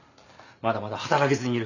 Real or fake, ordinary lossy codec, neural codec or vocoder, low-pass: real; none; none; 7.2 kHz